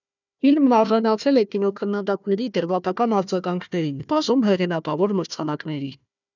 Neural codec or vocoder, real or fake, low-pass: codec, 16 kHz, 1 kbps, FunCodec, trained on Chinese and English, 50 frames a second; fake; 7.2 kHz